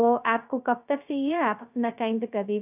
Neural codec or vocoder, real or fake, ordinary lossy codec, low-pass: codec, 16 kHz, 0.2 kbps, FocalCodec; fake; none; 3.6 kHz